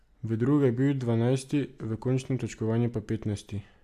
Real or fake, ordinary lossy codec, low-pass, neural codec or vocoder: real; AAC, 96 kbps; 14.4 kHz; none